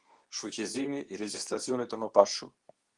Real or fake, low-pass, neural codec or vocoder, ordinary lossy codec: fake; 10.8 kHz; codec, 24 kHz, 0.9 kbps, WavTokenizer, medium speech release version 2; Opus, 24 kbps